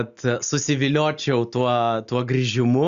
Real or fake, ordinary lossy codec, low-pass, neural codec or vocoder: real; Opus, 64 kbps; 7.2 kHz; none